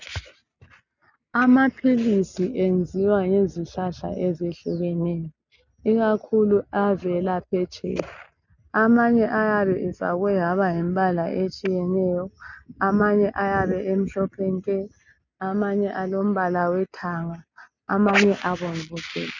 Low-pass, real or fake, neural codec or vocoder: 7.2 kHz; real; none